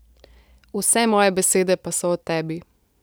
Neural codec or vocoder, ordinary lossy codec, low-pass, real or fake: none; none; none; real